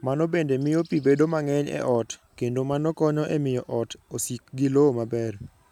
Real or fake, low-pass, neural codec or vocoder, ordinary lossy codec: real; 19.8 kHz; none; none